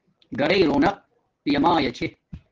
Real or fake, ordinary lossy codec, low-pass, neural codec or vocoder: real; Opus, 16 kbps; 7.2 kHz; none